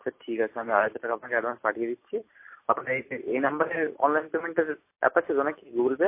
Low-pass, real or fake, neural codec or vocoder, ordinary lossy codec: 3.6 kHz; real; none; MP3, 24 kbps